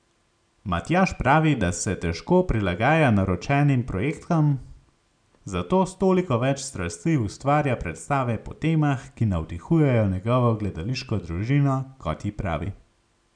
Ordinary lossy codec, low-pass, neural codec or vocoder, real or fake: none; 9.9 kHz; none; real